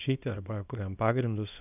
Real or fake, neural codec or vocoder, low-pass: fake; codec, 24 kHz, 0.9 kbps, WavTokenizer, small release; 3.6 kHz